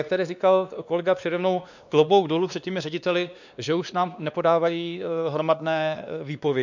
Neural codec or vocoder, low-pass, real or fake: codec, 16 kHz, 2 kbps, X-Codec, WavLM features, trained on Multilingual LibriSpeech; 7.2 kHz; fake